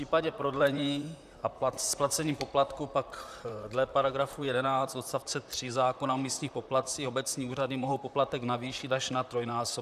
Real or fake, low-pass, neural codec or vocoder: fake; 14.4 kHz; vocoder, 44.1 kHz, 128 mel bands, Pupu-Vocoder